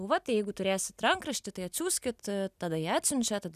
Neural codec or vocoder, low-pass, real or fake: none; 14.4 kHz; real